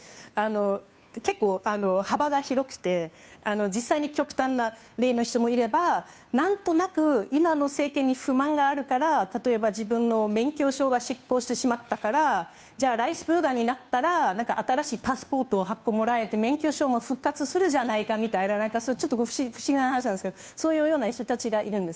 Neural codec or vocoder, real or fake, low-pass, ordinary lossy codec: codec, 16 kHz, 2 kbps, FunCodec, trained on Chinese and English, 25 frames a second; fake; none; none